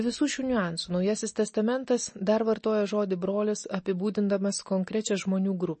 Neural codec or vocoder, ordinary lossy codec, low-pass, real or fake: none; MP3, 32 kbps; 10.8 kHz; real